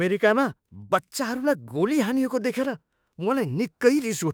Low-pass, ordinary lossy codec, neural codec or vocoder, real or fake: none; none; autoencoder, 48 kHz, 32 numbers a frame, DAC-VAE, trained on Japanese speech; fake